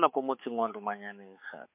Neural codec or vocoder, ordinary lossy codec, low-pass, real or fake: codec, 16 kHz, 4 kbps, X-Codec, HuBERT features, trained on balanced general audio; MP3, 24 kbps; 3.6 kHz; fake